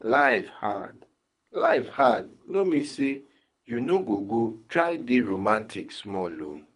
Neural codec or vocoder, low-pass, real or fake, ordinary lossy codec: codec, 24 kHz, 3 kbps, HILCodec; 10.8 kHz; fake; MP3, 96 kbps